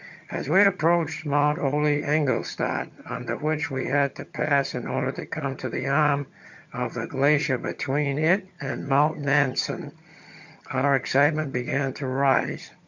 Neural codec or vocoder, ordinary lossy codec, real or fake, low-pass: vocoder, 22.05 kHz, 80 mel bands, HiFi-GAN; MP3, 64 kbps; fake; 7.2 kHz